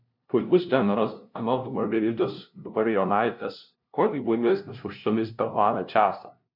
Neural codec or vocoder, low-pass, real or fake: codec, 16 kHz, 0.5 kbps, FunCodec, trained on LibriTTS, 25 frames a second; 5.4 kHz; fake